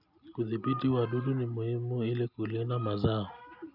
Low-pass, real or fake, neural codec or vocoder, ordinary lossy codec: 5.4 kHz; real; none; none